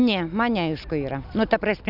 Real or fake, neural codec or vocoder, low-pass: real; none; 5.4 kHz